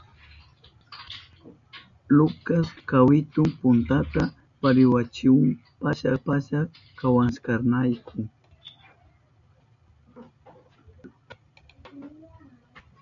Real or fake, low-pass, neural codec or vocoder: real; 7.2 kHz; none